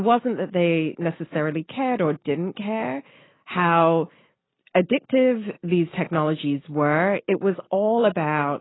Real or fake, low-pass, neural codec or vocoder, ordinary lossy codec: real; 7.2 kHz; none; AAC, 16 kbps